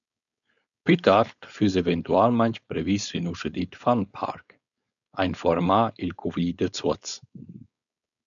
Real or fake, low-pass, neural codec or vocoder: fake; 7.2 kHz; codec, 16 kHz, 4.8 kbps, FACodec